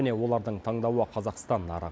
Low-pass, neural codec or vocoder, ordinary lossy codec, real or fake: none; none; none; real